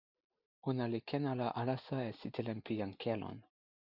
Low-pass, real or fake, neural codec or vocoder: 5.4 kHz; real; none